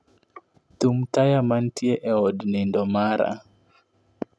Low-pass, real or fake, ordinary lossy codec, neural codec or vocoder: none; real; none; none